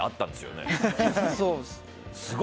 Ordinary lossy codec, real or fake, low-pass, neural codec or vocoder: none; real; none; none